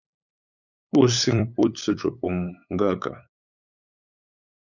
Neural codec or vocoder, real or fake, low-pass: codec, 16 kHz, 8 kbps, FunCodec, trained on LibriTTS, 25 frames a second; fake; 7.2 kHz